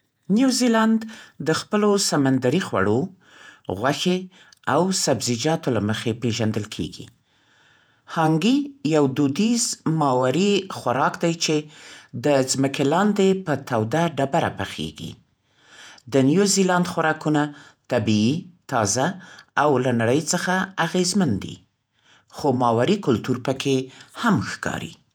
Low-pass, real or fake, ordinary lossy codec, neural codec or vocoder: none; fake; none; vocoder, 48 kHz, 128 mel bands, Vocos